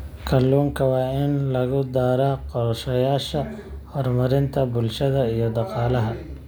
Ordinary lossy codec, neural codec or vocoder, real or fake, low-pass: none; none; real; none